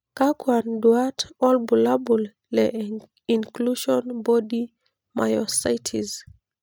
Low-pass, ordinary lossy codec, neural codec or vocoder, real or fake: none; none; none; real